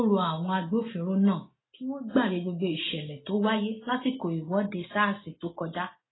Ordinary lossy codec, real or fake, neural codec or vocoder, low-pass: AAC, 16 kbps; real; none; 7.2 kHz